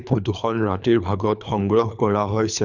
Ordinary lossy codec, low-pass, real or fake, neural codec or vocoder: none; 7.2 kHz; fake; codec, 24 kHz, 3 kbps, HILCodec